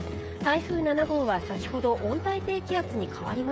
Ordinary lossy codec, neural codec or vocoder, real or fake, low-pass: none; codec, 16 kHz, 8 kbps, FreqCodec, smaller model; fake; none